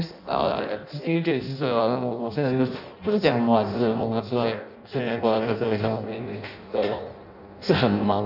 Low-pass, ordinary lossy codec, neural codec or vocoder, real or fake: 5.4 kHz; none; codec, 16 kHz in and 24 kHz out, 0.6 kbps, FireRedTTS-2 codec; fake